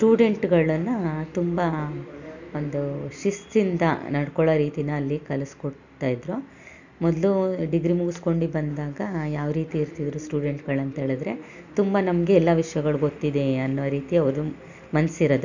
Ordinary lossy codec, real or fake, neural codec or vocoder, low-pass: none; real; none; 7.2 kHz